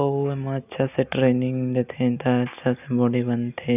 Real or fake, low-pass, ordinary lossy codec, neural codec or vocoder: real; 3.6 kHz; none; none